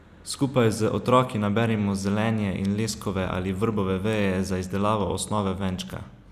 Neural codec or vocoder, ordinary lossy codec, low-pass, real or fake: none; none; 14.4 kHz; real